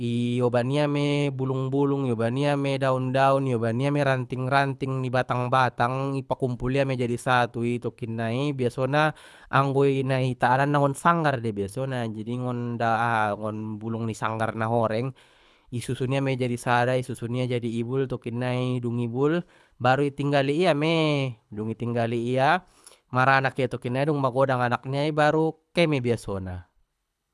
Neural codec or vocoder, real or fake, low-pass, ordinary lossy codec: codec, 24 kHz, 6 kbps, HILCodec; fake; none; none